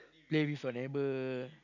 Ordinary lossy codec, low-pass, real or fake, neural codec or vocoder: none; 7.2 kHz; real; none